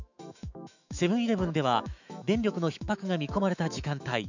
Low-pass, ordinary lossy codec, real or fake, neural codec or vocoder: 7.2 kHz; none; fake; autoencoder, 48 kHz, 128 numbers a frame, DAC-VAE, trained on Japanese speech